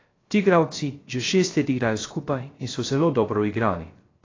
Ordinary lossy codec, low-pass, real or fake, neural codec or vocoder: AAC, 32 kbps; 7.2 kHz; fake; codec, 16 kHz, 0.3 kbps, FocalCodec